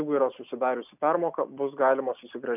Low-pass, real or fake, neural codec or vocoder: 3.6 kHz; real; none